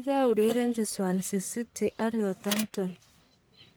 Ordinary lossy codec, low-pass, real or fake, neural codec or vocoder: none; none; fake; codec, 44.1 kHz, 1.7 kbps, Pupu-Codec